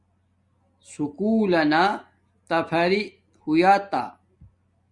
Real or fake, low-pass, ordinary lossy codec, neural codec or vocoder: real; 10.8 kHz; Opus, 64 kbps; none